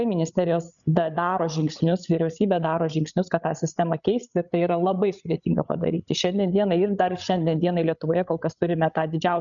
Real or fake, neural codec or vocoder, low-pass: fake; codec, 16 kHz, 16 kbps, FreqCodec, larger model; 7.2 kHz